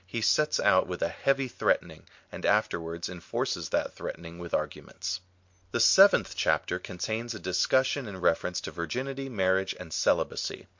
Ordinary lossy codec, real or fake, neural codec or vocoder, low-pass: MP3, 48 kbps; real; none; 7.2 kHz